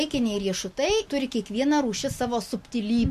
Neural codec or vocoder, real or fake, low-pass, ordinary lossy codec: none; real; 14.4 kHz; MP3, 64 kbps